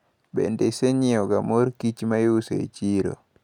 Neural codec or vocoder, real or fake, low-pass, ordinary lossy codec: none; real; 19.8 kHz; none